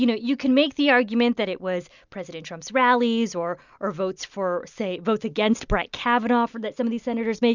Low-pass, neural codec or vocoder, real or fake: 7.2 kHz; none; real